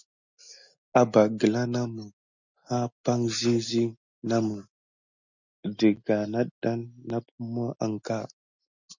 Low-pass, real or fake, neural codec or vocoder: 7.2 kHz; real; none